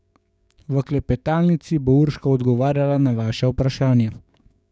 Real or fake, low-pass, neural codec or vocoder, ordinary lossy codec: fake; none; codec, 16 kHz, 6 kbps, DAC; none